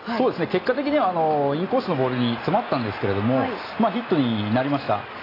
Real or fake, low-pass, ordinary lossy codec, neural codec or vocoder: real; 5.4 kHz; AAC, 24 kbps; none